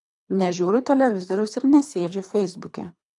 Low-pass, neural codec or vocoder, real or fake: 10.8 kHz; codec, 24 kHz, 3 kbps, HILCodec; fake